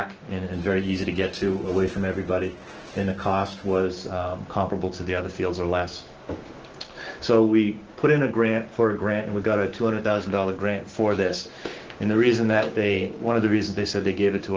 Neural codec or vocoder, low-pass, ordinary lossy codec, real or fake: codec, 16 kHz, 6 kbps, DAC; 7.2 kHz; Opus, 16 kbps; fake